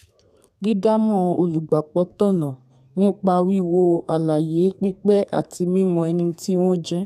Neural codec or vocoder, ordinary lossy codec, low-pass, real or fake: codec, 32 kHz, 1.9 kbps, SNAC; none; 14.4 kHz; fake